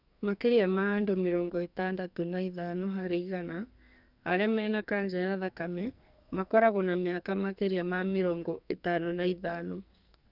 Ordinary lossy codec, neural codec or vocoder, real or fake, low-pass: none; codec, 44.1 kHz, 2.6 kbps, SNAC; fake; 5.4 kHz